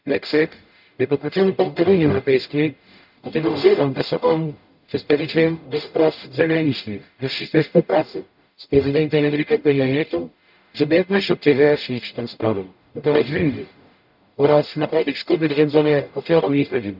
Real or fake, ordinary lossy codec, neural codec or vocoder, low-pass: fake; none; codec, 44.1 kHz, 0.9 kbps, DAC; 5.4 kHz